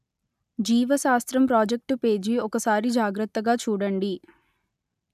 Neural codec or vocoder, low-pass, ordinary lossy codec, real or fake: none; 14.4 kHz; none; real